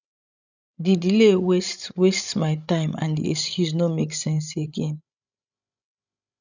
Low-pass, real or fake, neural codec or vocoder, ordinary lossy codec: 7.2 kHz; fake; codec, 16 kHz, 16 kbps, FreqCodec, larger model; none